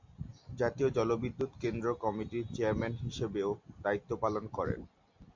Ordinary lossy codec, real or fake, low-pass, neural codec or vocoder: MP3, 48 kbps; real; 7.2 kHz; none